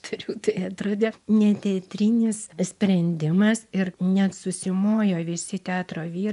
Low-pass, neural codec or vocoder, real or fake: 10.8 kHz; vocoder, 24 kHz, 100 mel bands, Vocos; fake